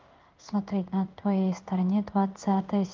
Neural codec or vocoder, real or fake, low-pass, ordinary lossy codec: codec, 16 kHz in and 24 kHz out, 1 kbps, XY-Tokenizer; fake; 7.2 kHz; Opus, 24 kbps